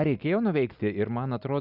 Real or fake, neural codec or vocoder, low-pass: real; none; 5.4 kHz